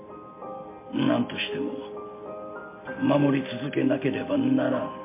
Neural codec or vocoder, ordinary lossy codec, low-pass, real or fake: none; MP3, 24 kbps; 3.6 kHz; real